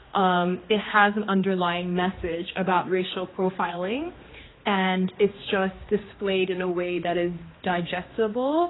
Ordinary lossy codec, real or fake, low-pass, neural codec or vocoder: AAC, 16 kbps; fake; 7.2 kHz; codec, 16 kHz, 2 kbps, X-Codec, HuBERT features, trained on general audio